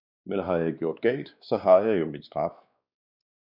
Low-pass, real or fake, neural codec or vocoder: 5.4 kHz; fake; codec, 16 kHz, 2 kbps, X-Codec, WavLM features, trained on Multilingual LibriSpeech